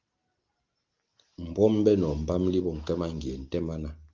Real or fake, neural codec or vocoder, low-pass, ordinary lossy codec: real; none; 7.2 kHz; Opus, 32 kbps